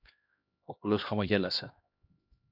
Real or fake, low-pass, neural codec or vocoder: fake; 5.4 kHz; codec, 16 kHz, 1 kbps, X-Codec, HuBERT features, trained on LibriSpeech